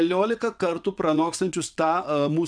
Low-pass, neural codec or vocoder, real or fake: 9.9 kHz; vocoder, 22.05 kHz, 80 mel bands, WaveNeXt; fake